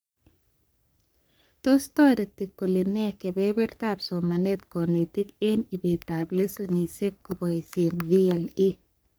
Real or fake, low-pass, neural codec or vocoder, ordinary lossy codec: fake; none; codec, 44.1 kHz, 3.4 kbps, Pupu-Codec; none